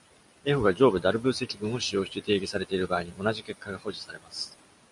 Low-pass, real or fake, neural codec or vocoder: 10.8 kHz; real; none